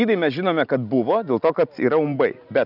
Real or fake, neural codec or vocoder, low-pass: real; none; 5.4 kHz